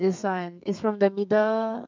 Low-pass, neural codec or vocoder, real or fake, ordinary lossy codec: 7.2 kHz; codec, 32 kHz, 1.9 kbps, SNAC; fake; MP3, 64 kbps